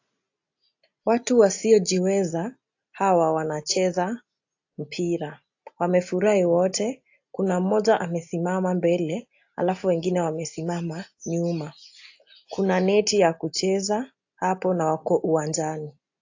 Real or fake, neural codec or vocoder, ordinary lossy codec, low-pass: real; none; AAC, 48 kbps; 7.2 kHz